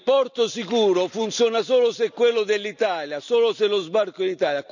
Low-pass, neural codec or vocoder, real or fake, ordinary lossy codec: 7.2 kHz; none; real; none